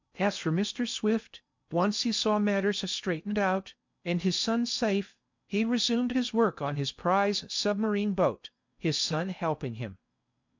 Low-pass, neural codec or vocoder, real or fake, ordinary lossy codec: 7.2 kHz; codec, 16 kHz in and 24 kHz out, 0.6 kbps, FocalCodec, streaming, 2048 codes; fake; MP3, 64 kbps